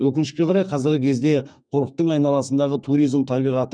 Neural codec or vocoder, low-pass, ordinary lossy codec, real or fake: codec, 32 kHz, 1.9 kbps, SNAC; 9.9 kHz; none; fake